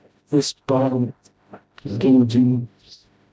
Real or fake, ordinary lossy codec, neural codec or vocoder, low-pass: fake; none; codec, 16 kHz, 0.5 kbps, FreqCodec, smaller model; none